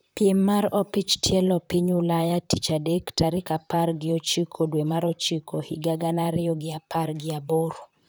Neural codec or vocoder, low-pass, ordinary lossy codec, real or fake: vocoder, 44.1 kHz, 128 mel bands, Pupu-Vocoder; none; none; fake